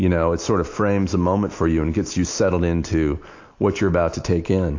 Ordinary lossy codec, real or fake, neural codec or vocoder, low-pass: AAC, 48 kbps; real; none; 7.2 kHz